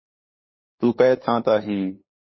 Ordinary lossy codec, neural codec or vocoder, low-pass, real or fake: MP3, 24 kbps; codec, 16 kHz, 8 kbps, FunCodec, trained on LibriTTS, 25 frames a second; 7.2 kHz; fake